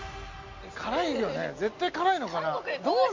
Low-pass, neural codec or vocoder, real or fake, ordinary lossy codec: 7.2 kHz; none; real; MP3, 48 kbps